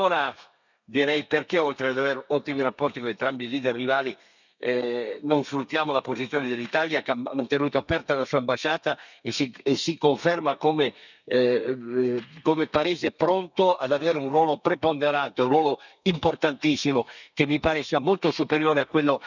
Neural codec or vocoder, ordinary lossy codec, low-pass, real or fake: codec, 32 kHz, 1.9 kbps, SNAC; none; 7.2 kHz; fake